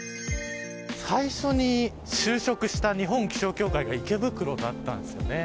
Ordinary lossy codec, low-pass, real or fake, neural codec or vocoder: none; none; real; none